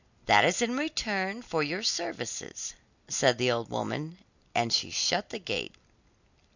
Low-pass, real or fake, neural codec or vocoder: 7.2 kHz; real; none